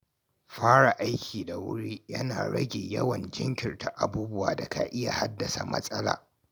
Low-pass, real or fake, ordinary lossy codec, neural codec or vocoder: none; real; none; none